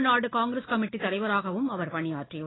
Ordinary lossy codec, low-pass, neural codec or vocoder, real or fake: AAC, 16 kbps; 7.2 kHz; none; real